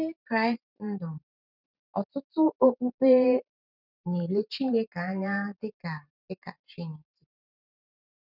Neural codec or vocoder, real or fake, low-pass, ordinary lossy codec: vocoder, 44.1 kHz, 128 mel bands every 512 samples, BigVGAN v2; fake; 5.4 kHz; none